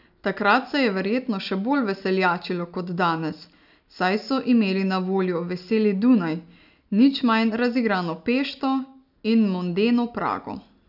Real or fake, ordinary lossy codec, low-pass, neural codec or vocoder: real; none; 5.4 kHz; none